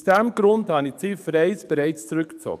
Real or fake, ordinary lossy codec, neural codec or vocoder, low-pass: fake; none; codec, 44.1 kHz, 7.8 kbps, DAC; 14.4 kHz